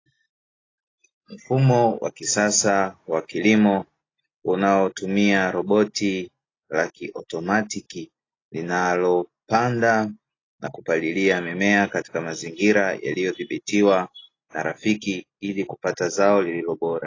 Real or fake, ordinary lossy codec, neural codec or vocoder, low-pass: real; AAC, 32 kbps; none; 7.2 kHz